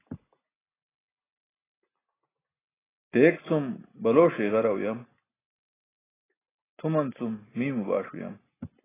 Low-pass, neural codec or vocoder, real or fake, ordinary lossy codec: 3.6 kHz; none; real; AAC, 16 kbps